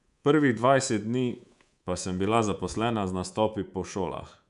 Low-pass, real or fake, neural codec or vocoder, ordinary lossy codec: 10.8 kHz; fake; codec, 24 kHz, 3.1 kbps, DualCodec; none